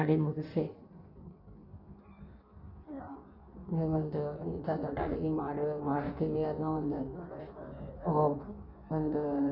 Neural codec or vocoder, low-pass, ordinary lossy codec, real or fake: codec, 16 kHz in and 24 kHz out, 1.1 kbps, FireRedTTS-2 codec; 5.4 kHz; none; fake